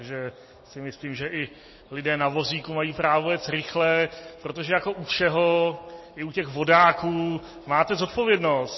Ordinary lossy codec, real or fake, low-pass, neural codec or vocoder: MP3, 24 kbps; real; 7.2 kHz; none